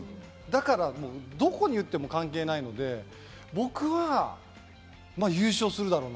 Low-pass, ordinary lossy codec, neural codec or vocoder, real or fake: none; none; none; real